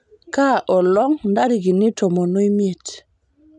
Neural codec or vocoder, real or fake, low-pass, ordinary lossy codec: none; real; 10.8 kHz; none